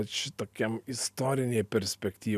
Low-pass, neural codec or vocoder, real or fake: 14.4 kHz; none; real